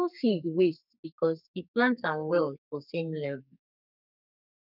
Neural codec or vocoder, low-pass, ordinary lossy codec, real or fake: codec, 32 kHz, 1.9 kbps, SNAC; 5.4 kHz; none; fake